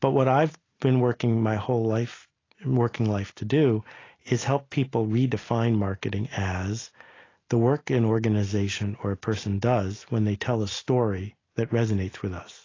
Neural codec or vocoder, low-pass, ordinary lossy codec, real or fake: none; 7.2 kHz; AAC, 32 kbps; real